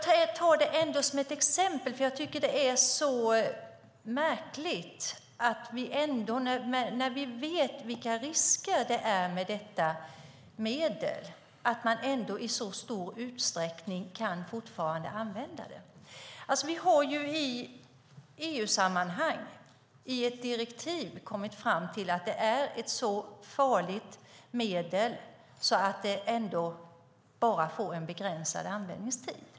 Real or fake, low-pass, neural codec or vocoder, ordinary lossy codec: real; none; none; none